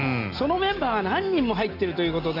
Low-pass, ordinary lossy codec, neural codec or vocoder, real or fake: 5.4 kHz; none; none; real